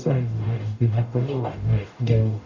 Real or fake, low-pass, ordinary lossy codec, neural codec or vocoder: fake; 7.2 kHz; none; codec, 44.1 kHz, 0.9 kbps, DAC